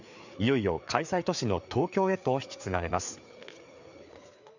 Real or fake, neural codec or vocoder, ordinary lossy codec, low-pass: fake; codec, 16 kHz, 4 kbps, FreqCodec, larger model; none; 7.2 kHz